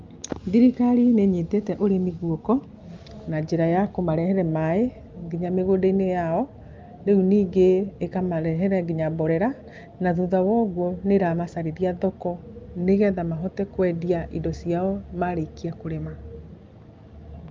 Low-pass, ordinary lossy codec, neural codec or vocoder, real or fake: 7.2 kHz; Opus, 32 kbps; none; real